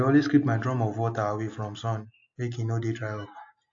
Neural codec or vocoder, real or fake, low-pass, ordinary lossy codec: none; real; 7.2 kHz; AAC, 48 kbps